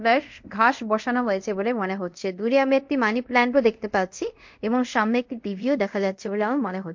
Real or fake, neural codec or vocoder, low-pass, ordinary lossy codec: fake; codec, 24 kHz, 0.5 kbps, DualCodec; 7.2 kHz; MP3, 48 kbps